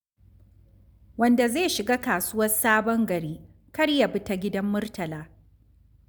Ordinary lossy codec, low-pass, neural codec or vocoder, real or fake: none; none; none; real